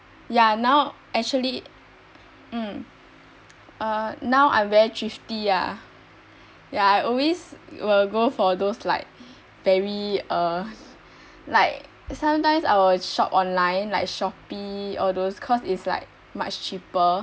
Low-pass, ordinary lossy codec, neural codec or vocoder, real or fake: none; none; none; real